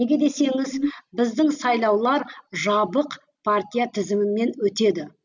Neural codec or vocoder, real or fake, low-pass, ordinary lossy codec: none; real; 7.2 kHz; none